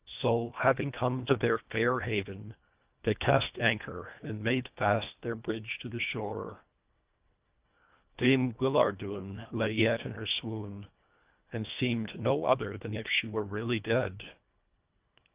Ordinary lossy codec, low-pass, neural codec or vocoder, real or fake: Opus, 24 kbps; 3.6 kHz; codec, 24 kHz, 1.5 kbps, HILCodec; fake